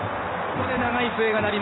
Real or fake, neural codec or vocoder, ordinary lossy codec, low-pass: real; none; AAC, 16 kbps; 7.2 kHz